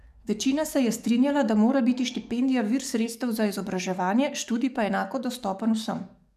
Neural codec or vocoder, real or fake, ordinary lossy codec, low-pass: codec, 44.1 kHz, 7.8 kbps, DAC; fake; none; 14.4 kHz